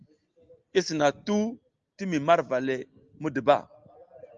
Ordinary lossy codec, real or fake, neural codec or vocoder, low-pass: Opus, 24 kbps; real; none; 7.2 kHz